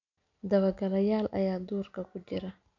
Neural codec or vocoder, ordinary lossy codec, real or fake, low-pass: none; none; real; 7.2 kHz